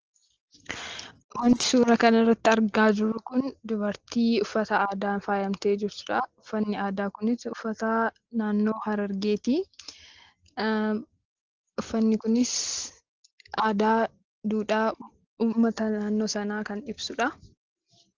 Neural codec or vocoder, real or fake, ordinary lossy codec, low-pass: none; real; Opus, 16 kbps; 7.2 kHz